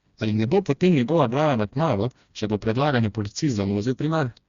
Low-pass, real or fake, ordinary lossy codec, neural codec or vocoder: 7.2 kHz; fake; Opus, 64 kbps; codec, 16 kHz, 1 kbps, FreqCodec, smaller model